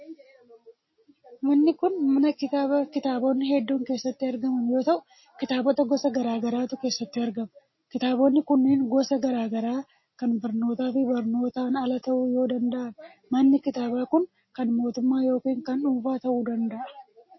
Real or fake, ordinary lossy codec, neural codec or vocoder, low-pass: real; MP3, 24 kbps; none; 7.2 kHz